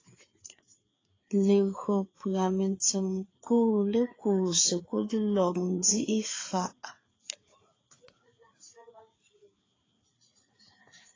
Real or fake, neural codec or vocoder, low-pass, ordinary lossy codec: fake; codec, 16 kHz, 4 kbps, FreqCodec, larger model; 7.2 kHz; AAC, 32 kbps